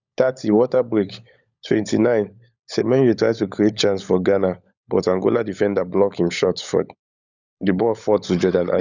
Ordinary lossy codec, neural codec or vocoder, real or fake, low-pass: none; codec, 16 kHz, 16 kbps, FunCodec, trained on LibriTTS, 50 frames a second; fake; 7.2 kHz